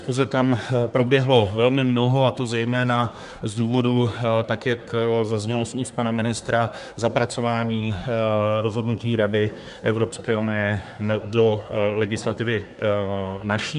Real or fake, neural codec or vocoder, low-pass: fake; codec, 24 kHz, 1 kbps, SNAC; 10.8 kHz